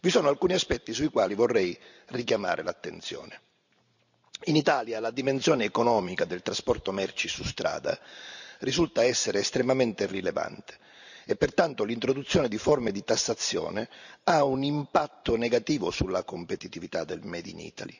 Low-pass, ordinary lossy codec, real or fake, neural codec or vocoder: 7.2 kHz; none; fake; vocoder, 44.1 kHz, 128 mel bands every 512 samples, BigVGAN v2